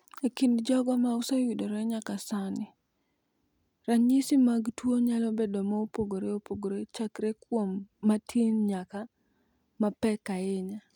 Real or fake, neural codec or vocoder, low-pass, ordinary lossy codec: real; none; 19.8 kHz; none